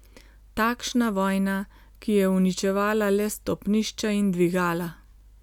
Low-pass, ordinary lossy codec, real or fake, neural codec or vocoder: 19.8 kHz; none; real; none